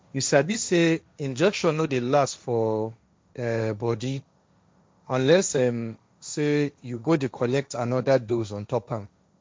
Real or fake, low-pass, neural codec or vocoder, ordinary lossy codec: fake; none; codec, 16 kHz, 1.1 kbps, Voila-Tokenizer; none